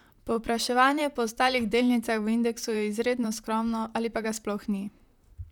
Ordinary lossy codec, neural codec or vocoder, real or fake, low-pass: none; vocoder, 44.1 kHz, 128 mel bands, Pupu-Vocoder; fake; 19.8 kHz